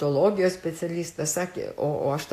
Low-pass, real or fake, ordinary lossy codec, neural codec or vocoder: 14.4 kHz; real; AAC, 64 kbps; none